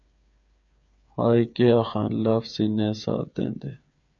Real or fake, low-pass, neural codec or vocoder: fake; 7.2 kHz; codec, 16 kHz, 8 kbps, FreqCodec, smaller model